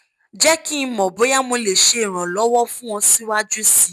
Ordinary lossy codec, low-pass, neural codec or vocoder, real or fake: none; 14.4 kHz; none; real